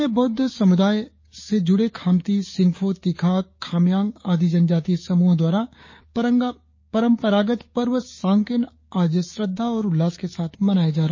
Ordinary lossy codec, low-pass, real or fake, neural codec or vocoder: MP3, 32 kbps; 7.2 kHz; fake; codec, 16 kHz, 6 kbps, DAC